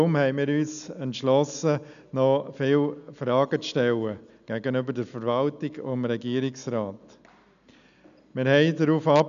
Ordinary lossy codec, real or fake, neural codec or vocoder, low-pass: none; real; none; 7.2 kHz